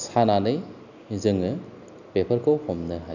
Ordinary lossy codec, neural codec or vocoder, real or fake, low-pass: none; none; real; 7.2 kHz